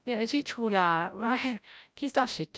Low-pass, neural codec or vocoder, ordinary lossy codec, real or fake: none; codec, 16 kHz, 0.5 kbps, FreqCodec, larger model; none; fake